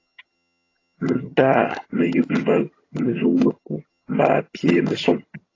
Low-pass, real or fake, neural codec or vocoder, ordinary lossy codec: 7.2 kHz; fake; vocoder, 22.05 kHz, 80 mel bands, HiFi-GAN; AAC, 32 kbps